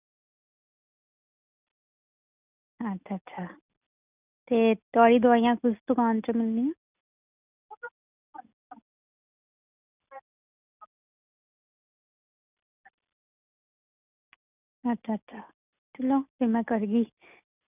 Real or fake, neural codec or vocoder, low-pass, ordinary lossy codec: real; none; 3.6 kHz; none